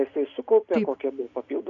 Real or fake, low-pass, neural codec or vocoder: real; 7.2 kHz; none